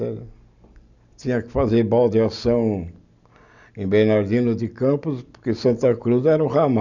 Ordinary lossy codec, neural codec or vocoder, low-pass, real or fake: none; none; 7.2 kHz; real